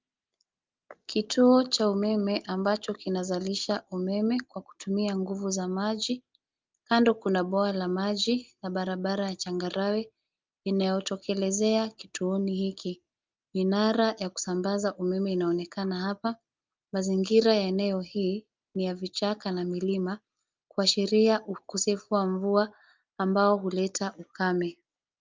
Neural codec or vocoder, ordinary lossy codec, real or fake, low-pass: none; Opus, 24 kbps; real; 7.2 kHz